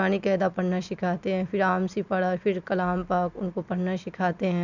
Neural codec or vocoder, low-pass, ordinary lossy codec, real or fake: none; 7.2 kHz; none; real